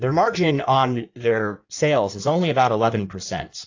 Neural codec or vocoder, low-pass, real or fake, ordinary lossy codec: codec, 16 kHz in and 24 kHz out, 1.1 kbps, FireRedTTS-2 codec; 7.2 kHz; fake; AAC, 48 kbps